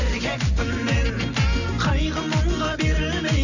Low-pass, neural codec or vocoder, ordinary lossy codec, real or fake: 7.2 kHz; none; none; real